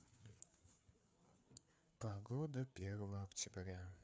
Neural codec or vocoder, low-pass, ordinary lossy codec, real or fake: codec, 16 kHz, 4 kbps, FreqCodec, larger model; none; none; fake